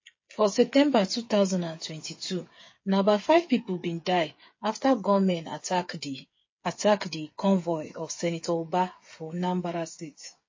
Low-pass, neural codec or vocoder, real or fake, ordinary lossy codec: 7.2 kHz; codec, 16 kHz, 8 kbps, FreqCodec, smaller model; fake; MP3, 32 kbps